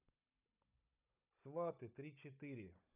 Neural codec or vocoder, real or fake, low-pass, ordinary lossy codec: codec, 16 kHz, 16 kbps, FunCodec, trained on Chinese and English, 50 frames a second; fake; 3.6 kHz; none